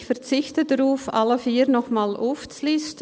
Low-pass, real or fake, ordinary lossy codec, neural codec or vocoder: none; real; none; none